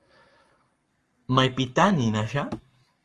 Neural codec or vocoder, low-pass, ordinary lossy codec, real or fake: codec, 44.1 kHz, 7.8 kbps, Pupu-Codec; 10.8 kHz; Opus, 32 kbps; fake